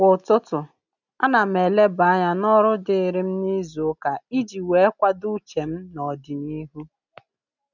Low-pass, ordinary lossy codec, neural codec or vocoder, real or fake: 7.2 kHz; none; none; real